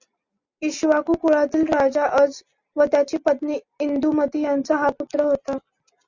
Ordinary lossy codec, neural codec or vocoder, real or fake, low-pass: Opus, 64 kbps; none; real; 7.2 kHz